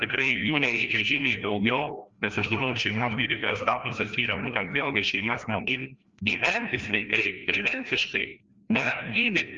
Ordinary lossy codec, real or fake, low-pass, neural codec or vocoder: Opus, 24 kbps; fake; 7.2 kHz; codec, 16 kHz, 1 kbps, FreqCodec, larger model